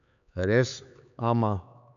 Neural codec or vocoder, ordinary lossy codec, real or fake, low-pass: codec, 16 kHz, 4 kbps, X-Codec, HuBERT features, trained on LibriSpeech; none; fake; 7.2 kHz